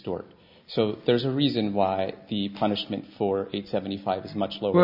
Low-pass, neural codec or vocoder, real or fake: 5.4 kHz; none; real